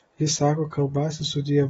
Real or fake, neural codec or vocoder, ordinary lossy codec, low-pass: real; none; AAC, 24 kbps; 10.8 kHz